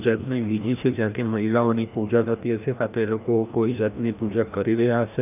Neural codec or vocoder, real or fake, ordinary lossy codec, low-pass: codec, 16 kHz, 1 kbps, FreqCodec, larger model; fake; none; 3.6 kHz